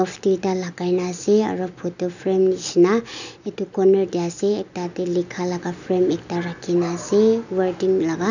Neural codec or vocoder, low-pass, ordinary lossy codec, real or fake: none; 7.2 kHz; none; real